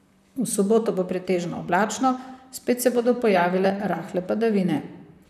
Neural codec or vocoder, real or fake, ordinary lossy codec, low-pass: vocoder, 44.1 kHz, 128 mel bands, Pupu-Vocoder; fake; none; 14.4 kHz